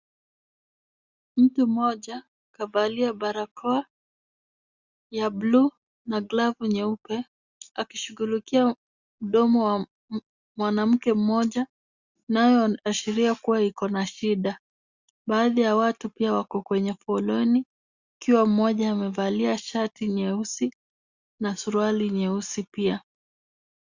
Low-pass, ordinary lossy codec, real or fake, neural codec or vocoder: 7.2 kHz; Opus, 64 kbps; real; none